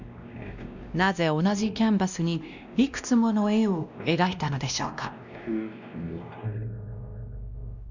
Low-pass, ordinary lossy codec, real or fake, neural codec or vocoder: 7.2 kHz; none; fake; codec, 16 kHz, 1 kbps, X-Codec, WavLM features, trained on Multilingual LibriSpeech